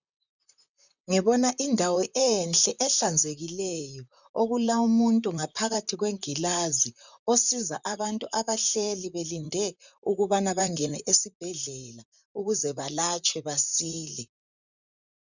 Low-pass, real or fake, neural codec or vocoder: 7.2 kHz; fake; vocoder, 44.1 kHz, 128 mel bands, Pupu-Vocoder